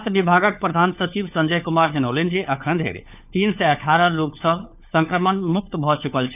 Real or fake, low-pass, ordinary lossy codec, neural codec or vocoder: fake; 3.6 kHz; none; codec, 16 kHz, 4 kbps, FunCodec, trained on LibriTTS, 50 frames a second